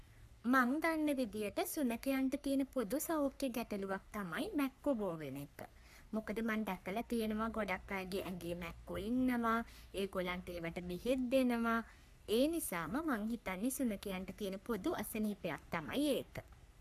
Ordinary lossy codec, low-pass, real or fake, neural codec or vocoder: none; 14.4 kHz; fake; codec, 44.1 kHz, 3.4 kbps, Pupu-Codec